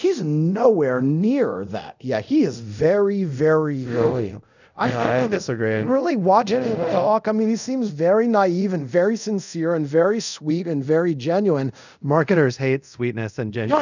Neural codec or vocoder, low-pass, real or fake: codec, 24 kHz, 0.5 kbps, DualCodec; 7.2 kHz; fake